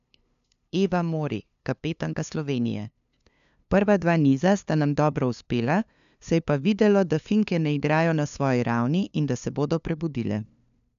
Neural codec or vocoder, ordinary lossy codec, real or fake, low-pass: codec, 16 kHz, 2 kbps, FunCodec, trained on LibriTTS, 25 frames a second; none; fake; 7.2 kHz